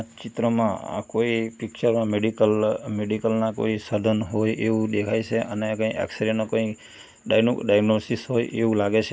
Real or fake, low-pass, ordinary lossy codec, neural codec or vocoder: real; none; none; none